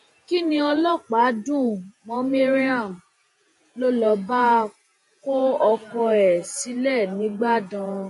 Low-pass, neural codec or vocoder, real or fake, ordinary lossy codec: 14.4 kHz; vocoder, 48 kHz, 128 mel bands, Vocos; fake; MP3, 48 kbps